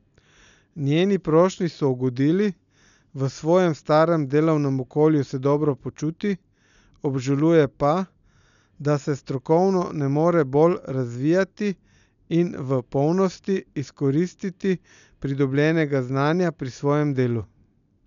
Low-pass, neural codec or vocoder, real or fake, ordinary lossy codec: 7.2 kHz; none; real; none